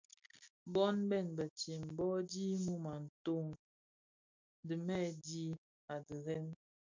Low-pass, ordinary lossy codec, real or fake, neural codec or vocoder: 7.2 kHz; MP3, 64 kbps; real; none